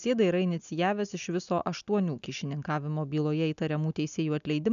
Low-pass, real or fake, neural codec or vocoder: 7.2 kHz; real; none